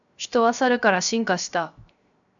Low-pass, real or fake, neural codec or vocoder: 7.2 kHz; fake; codec, 16 kHz, 0.7 kbps, FocalCodec